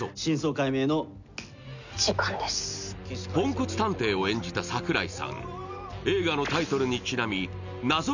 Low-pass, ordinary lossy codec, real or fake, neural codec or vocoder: 7.2 kHz; none; real; none